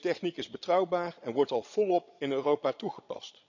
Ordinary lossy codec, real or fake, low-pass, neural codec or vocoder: none; fake; 7.2 kHz; vocoder, 22.05 kHz, 80 mel bands, Vocos